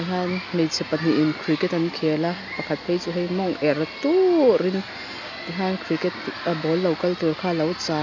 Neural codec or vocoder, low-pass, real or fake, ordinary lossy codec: none; 7.2 kHz; real; none